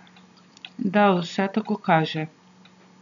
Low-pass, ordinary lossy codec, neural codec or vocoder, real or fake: 7.2 kHz; none; none; real